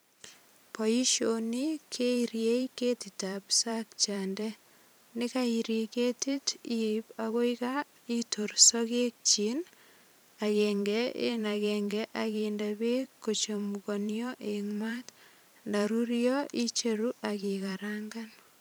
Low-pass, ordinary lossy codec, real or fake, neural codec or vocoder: none; none; fake; vocoder, 44.1 kHz, 128 mel bands every 512 samples, BigVGAN v2